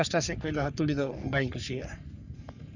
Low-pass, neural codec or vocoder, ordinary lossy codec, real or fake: 7.2 kHz; codec, 44.1 kHz, 3.4 kbps, Pupu-Codec; none; fake